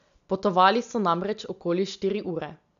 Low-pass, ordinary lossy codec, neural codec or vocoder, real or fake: 7.2 kHz; none; none; real